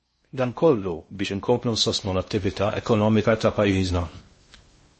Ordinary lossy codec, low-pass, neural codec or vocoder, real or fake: MP3, 32 kbps; 10.8 kHz; codec, 16 kHz in and 24 kHz out, 0.6 kbps, FocalCodec, streaming, 4096 codes; fake